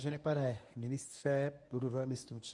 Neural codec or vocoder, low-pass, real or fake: codec, 24 kHz, 0.9 kbps, WavTokenizer, medium speech release version 1; 10.8 kHz; fake